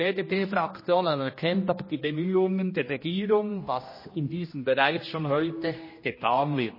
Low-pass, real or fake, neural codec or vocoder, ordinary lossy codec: 5.4 kHz; fake; codec, 16 kHz, 1 kbps, X-Codec, HuBERT features, trained on general audio; MP3, 24 kbps